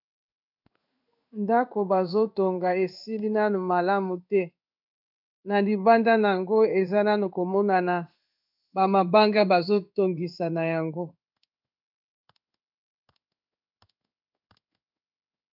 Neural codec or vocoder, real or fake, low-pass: codec, 16 kHz in and 24 kHz out, 1 kbps, XY-Tokenizer; fake; 5.4 kHz